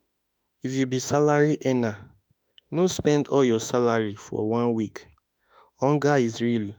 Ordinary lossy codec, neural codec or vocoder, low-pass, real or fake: none; autoencoder, 48 kHz, 32 numbers a frame, DAC-VAE, trained on Japanese speech; none; fake